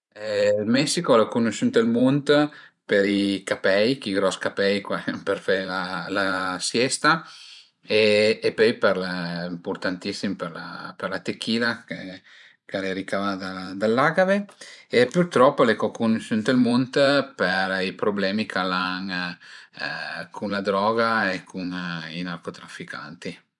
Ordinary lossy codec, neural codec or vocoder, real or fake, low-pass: none; vocoder, 44.1 kHz, 128 mel bands every 512 samples, BigVGAN v2; fake; 10.8 kHz